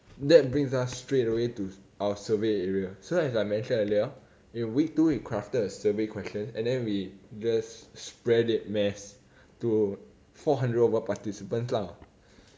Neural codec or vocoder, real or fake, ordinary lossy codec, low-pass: none; real; none; none